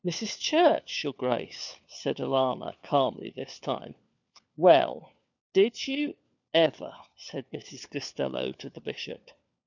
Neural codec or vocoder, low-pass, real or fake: codec, 16 kHz, 4 kbps, FunCodec, trained on LibriTTS, 50 frames a second; 7.2 kHz; fake